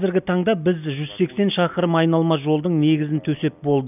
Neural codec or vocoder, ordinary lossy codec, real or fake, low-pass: none; none; real; 3.6 kHz